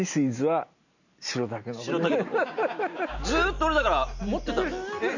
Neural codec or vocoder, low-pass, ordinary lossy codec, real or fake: none; 7.2 kHz; none; real